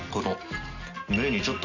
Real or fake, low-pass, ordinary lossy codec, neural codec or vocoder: real; 7.2 kHz; none; none